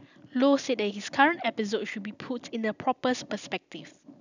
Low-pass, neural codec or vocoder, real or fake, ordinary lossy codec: 7.2 kHz; none; real; none